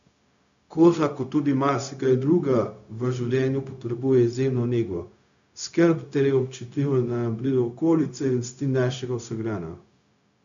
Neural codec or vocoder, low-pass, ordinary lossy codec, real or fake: codec, 16 kHz, 0.4 kbps, LongCat-Audio-Codec; 7.2 kHz; none; fake